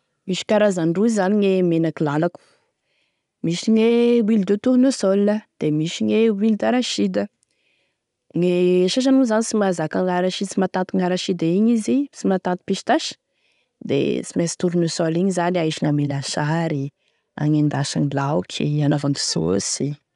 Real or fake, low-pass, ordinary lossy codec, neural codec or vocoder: real; 10.8 kHz; none; none